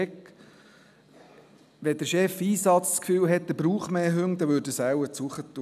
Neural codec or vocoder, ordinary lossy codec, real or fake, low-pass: none; none; real; 14.4 kHz